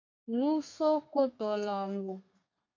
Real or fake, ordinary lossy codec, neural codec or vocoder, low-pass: fake; MP3, 64 kbps; codec, 32 kHz, 1.9 kbps, SNAC; 7.2 kHz